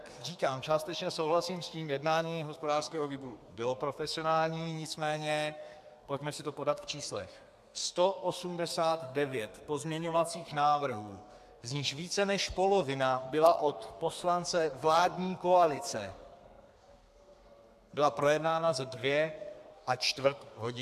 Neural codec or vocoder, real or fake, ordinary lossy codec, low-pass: codec, 32 kHz, 1.9 kbps, SNAC; fake; AAC, 96 kbps; 14.4 kHz